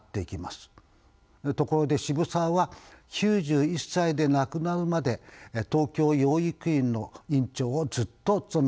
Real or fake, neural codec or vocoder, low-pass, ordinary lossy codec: real; none; none; none